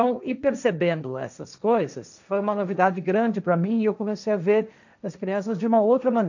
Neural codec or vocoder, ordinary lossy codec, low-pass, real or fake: codec, 16 kHz, 1.1 kbps, Voila-Tokenizer; none; 7.2 kHz; fake